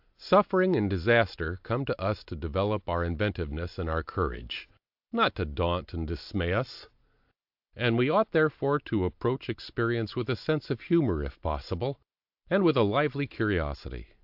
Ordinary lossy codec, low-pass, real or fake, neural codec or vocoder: AAC, 48 kbps; 5.4 kHz; real; none